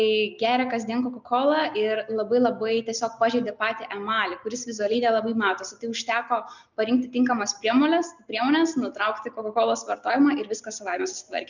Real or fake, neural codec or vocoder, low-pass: real; none; 7.2 kHz